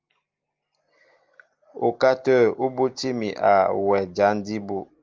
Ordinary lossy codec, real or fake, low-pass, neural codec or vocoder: Opus, 32 kbps; real; 7.2 kHz; none